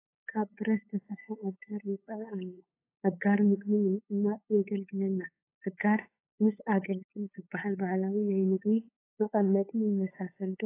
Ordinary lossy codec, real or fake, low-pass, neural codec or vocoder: AAC, 24 kbps; fake; 3.6 kHz; codec, 16 kHz, 8 kbps, FunCodec, trained on LibriTTS, 25 frames a second